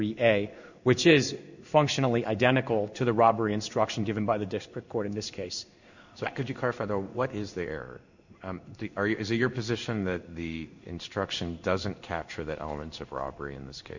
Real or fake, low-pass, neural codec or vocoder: fake; 7.2 kHz; codec, 16 kHz in and 24 kHz out, 1 kbps, XY-Tokenizer